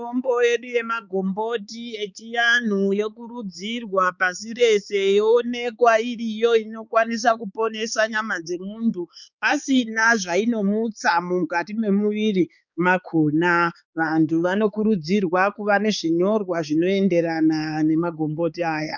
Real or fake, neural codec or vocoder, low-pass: fake; codec, 16 kHz, 4 kbps, X-Codec, HuBERT features, trained on balanced general audio; 7.2 kHz